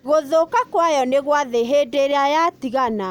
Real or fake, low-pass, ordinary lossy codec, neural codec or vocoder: real; 19.8 kHz; none; none